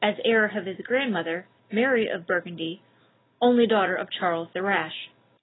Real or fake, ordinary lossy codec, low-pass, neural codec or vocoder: real; AAC, 16 kbps; 7.2 kHz; none